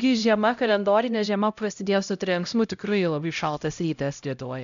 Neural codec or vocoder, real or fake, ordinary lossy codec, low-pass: codec, 16 kHz, 0.5 kbps, X-Codec, HuBERT features, trained on LibriSpeech; fake; MP3, 96 kbps; 7.2 kHz